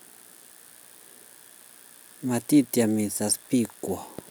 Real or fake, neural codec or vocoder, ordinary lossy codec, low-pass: real; none; none; none